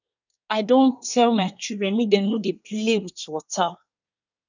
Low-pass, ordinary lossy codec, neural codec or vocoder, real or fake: 7.2 kHz; none; codec, 24 kHz, 1 kbps, SNAC; fake